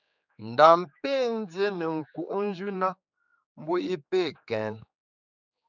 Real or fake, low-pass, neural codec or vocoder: fake; 7.2 kHz; codec, 16 kHz, 4 kbps, X-Codec, HuBERT features, trained on general audio